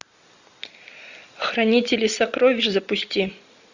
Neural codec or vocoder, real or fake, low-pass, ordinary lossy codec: none; real; 7.2 kHz; Opus, 64 kbps